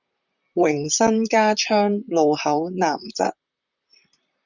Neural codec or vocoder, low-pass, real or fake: vocoder, 44.1 kHz, 128 mel bands, Pupu-Vocoder; 7.2 kHz; fake